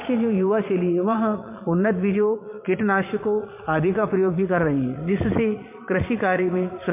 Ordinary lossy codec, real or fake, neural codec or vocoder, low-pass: MP3, 24 kbps; fake; vocoder, 22.05 kHz, 80 mel bands, Vocos; 3.6 kHz